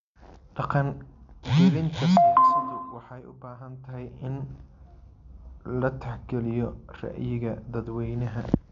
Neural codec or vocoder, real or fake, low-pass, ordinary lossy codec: none; real; 7.2 kHz; MP3, 64 kbps